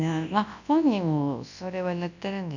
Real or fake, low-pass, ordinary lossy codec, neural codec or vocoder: fake; 7.2 kHz; none; codec, 24 kHz, 0.9 kbps, WavTokenizer, large speech release